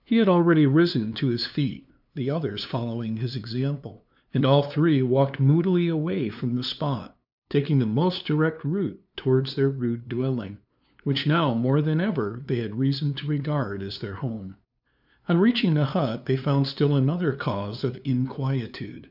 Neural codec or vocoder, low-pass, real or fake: codec, 16 kHz, 2 kbps, FunCodec, trained on LibriTTS, 25 frames a second; 5.4 kHz; fake